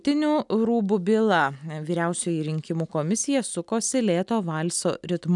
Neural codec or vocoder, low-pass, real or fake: none; 10.8 kHz; real